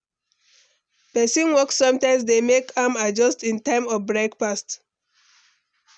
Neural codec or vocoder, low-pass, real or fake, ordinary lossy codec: none; 9.9 kHz; real; none